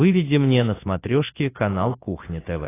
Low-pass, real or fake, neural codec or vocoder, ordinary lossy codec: 3.6 kHz; real; none; AAC, 16 kbps